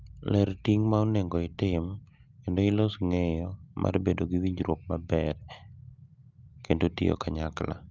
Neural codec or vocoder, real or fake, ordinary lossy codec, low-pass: none; real; Opus, 24 kbps; 7.2 kHz